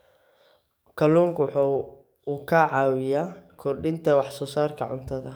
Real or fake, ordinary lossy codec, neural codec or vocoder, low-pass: fake; none; codec, 44.1 kHz, 7.8 kbps, DAC; none